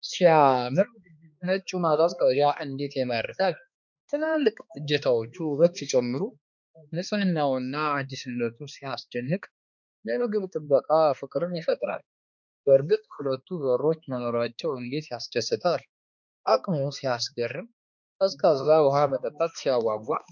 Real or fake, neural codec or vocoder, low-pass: fake; codec, 16 kHz, 2 kbps, X-Codec, HuBERT features, trained on balanced general audio; 7.2 kHz